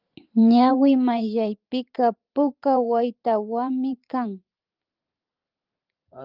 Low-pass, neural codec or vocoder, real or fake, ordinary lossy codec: 5.4 kHz; vocoder, 44.1 kHz, 128 mel bands every 512 samples, BigVGAN v2; fake; Opus, 32 kbps